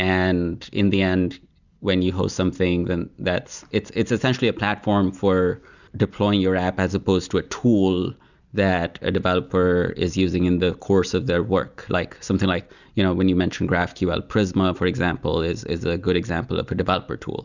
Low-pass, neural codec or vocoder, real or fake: 7.2 kHz; none; real